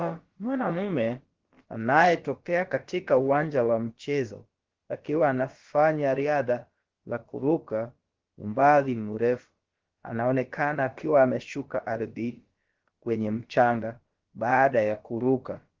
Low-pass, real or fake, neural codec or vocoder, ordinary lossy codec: 7.2 kHz; fake; codec, 16 kHz, about 1 kbps, DyCAST, with the encoder's durations; Opus, 16 kbps